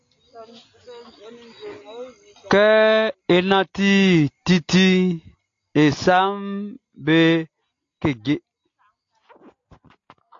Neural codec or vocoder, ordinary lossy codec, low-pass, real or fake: none; AAC, 48 kbps; 7.2 kHz; real